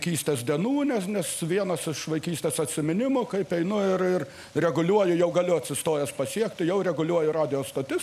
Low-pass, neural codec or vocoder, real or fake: 14.4 kHz; none; real